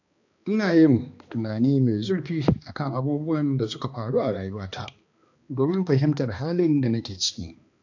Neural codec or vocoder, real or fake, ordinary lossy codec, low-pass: codec, 16 kHz, 2 kbps, X-Codec, HuBERT features, trained on balanced general audio; fake; AAC, 48 kbps; 7.2 kHz